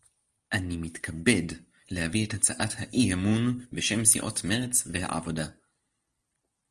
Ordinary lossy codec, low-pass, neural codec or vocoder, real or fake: Opus, 24 kbps; 10.8 kHz; none; real